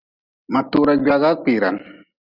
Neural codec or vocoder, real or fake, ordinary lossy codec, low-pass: none; real; Opus, 64 kbps; 5.4 kHz